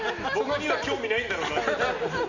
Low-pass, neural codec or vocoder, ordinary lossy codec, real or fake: 7.2 kHz; none; none; real